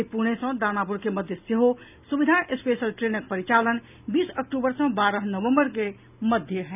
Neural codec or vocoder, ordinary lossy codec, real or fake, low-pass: none; none; real; 3.6 kHz